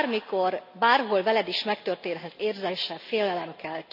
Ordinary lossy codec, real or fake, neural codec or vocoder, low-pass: none; real; none; 5.4 kHz